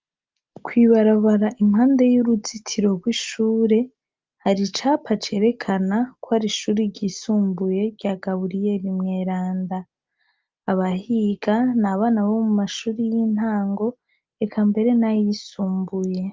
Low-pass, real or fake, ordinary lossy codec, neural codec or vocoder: 7.2 kHz; real; Opus, 24 kbps; none